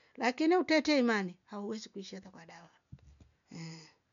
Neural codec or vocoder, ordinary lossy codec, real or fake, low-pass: none; none; real; 7.2 kHz